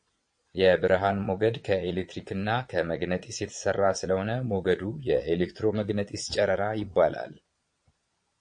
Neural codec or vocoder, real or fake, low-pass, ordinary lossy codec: vocoder, 22.05 kHz, 80 mel bands, Vocos; fake; 9.9 kHz; MP3, 48 kbps